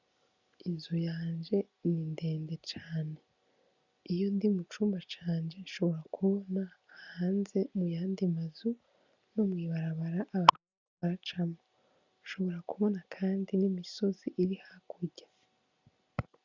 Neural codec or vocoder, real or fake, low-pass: none; real; 7.2 kHz